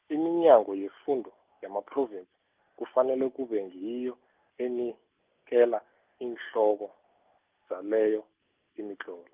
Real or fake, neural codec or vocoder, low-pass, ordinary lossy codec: real; none; 3.6 kHz; Opus, 24 kbps